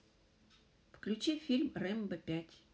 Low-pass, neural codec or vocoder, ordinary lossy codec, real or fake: none; none; none; real